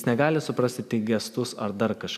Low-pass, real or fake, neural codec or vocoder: 14.4 kHz; real; none